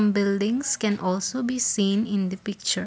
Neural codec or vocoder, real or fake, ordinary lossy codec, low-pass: none; real; none; none